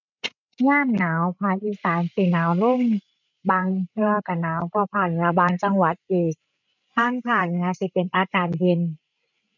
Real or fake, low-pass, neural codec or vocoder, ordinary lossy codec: fake; 7.2 kHz; codec, 16 kHz, 16 kbps, FreqCodec, larger model; none